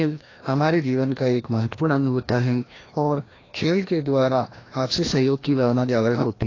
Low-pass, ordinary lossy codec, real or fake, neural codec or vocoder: 7.2 kHz; AAC, 32 kbps; fake; codec, 16 kHz, 1 kbps, FreqCodec, larger model